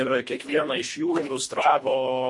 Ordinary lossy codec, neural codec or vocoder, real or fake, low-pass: MP3, 48 kbps; codec, 24 kHz, 1.5 kbps, HILCodec; fake; 10.8 kHz